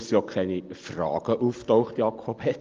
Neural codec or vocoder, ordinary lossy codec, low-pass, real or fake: none; Opus, 32 kbps; 7.2 kHz; real